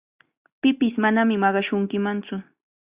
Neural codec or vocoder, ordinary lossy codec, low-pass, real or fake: none; Opus, 64 kbps; 3.6 kHz; real